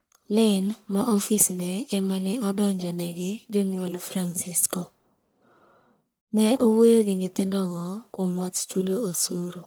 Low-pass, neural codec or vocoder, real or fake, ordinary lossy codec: none; codec, 44.1 kHz, 1.7 kbps, Pupu-Codec; fake; none